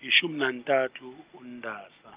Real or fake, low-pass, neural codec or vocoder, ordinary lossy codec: real; 3.6 kHz; none; Opus, 24 kbps